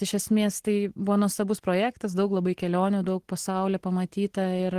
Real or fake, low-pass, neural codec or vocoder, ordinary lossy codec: real; 14.4 kHz; none; Opus, 16 kbps